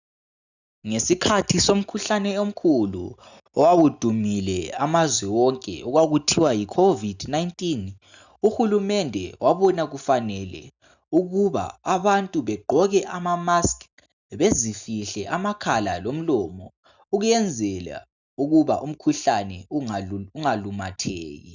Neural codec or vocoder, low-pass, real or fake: none; 7.2 kHz; real